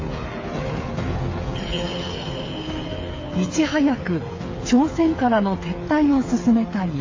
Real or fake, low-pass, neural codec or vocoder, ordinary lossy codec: fake; 7.2 kHz; codec, 16 kHz, 8 kbps, FreqCodec, smaller model; AAC, 32 kbps